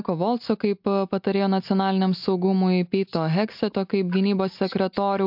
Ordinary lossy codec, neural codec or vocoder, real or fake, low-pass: MP3, 48 kbps; none; real; 5.4 kHz